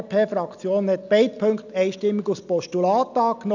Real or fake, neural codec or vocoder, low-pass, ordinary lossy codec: real; none; 7.2 kHz; none